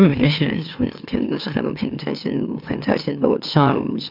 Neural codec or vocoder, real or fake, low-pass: autoencoder, 44.1 kHz, a latent of 192 numbers a frame, MeloTTS; fake; 5.4 kHz